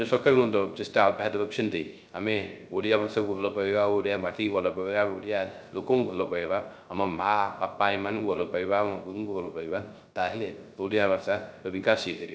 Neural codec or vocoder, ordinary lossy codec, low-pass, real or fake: codec, 16 kHz, 0.3 kbps, FocalCodec; none; none; fake